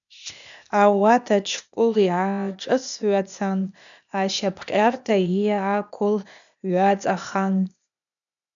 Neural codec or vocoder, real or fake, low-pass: codec, 16 kHz, 0.8 kbps, ZipCodec; fake; 7.2 kHz